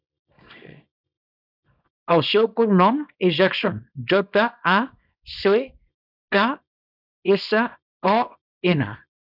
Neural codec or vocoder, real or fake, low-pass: codec, 24 kHz, 0.9 kbps, WavTokenizer, small release; fake; 5.4 kHz